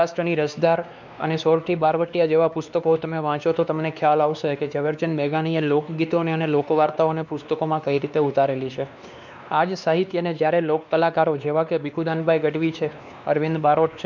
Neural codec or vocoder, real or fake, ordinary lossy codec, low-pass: codec, 16 kHz, 2 kbps, X-Codec, WavLM features, trained on Multilingual LibriSpeech; fake; none; 7.2 kHz